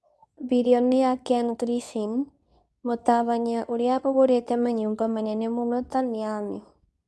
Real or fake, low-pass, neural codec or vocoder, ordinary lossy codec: fake; none; codec, 24 kHz, 0.9 kbps, WavTokenizer, medium speech release version 2; none